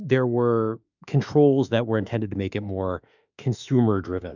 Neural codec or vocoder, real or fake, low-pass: autoencoder, 48 kHz, 32 numbers a frame, DAC-VAE, trained on Japanese speech; fake; 7.2 kHz